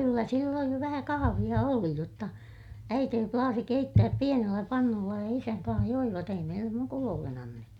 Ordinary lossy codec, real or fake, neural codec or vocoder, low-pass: none; real; none; 19.8 kHz